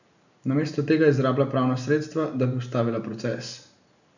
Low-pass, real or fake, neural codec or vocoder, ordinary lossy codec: 7.2 kHz; real; none; none